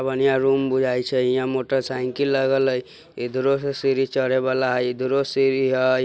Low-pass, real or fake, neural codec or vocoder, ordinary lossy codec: none; real; none; none